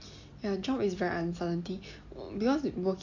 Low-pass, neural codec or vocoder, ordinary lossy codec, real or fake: 7.2 kHz; none; AAC, 48 kbps; real